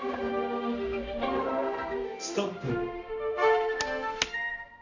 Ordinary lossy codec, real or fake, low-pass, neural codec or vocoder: none; fake; 7.2 kHz; codec, 16 kHz, 1 kbps, X-Codec, HuBERT features, trained on balanced general audio